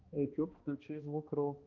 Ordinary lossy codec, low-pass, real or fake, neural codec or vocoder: Opus, 32 kbps; 7.2 kHz; fake; codec, 16 kHz, 1 kbps, X-Codec, HuBERT features, trained on balanced general audio